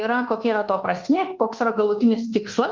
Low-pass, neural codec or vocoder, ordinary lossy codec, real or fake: 7.2 kHz; codec, 24 kHz, 1.2 kbps, DualCodec; Opus, 24 kbps; fake